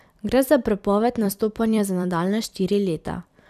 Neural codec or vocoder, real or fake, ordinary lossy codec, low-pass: vocoder, 44.1 kHz, 128 mel bands every 256 samples, BigVGAN v2; fake; none; 14.4 kHz